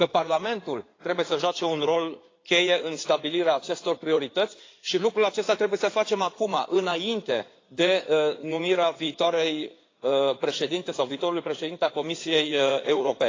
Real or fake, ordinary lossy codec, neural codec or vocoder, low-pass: fake; AAC, 32 kbps; codec, 16 kHz in and 24 kHz out, 2.2 kbps, FireRedTTS-2 codec; 7.2 kHz